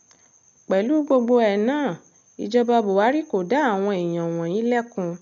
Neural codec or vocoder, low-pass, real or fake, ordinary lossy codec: none; 7.2 kHz; real; none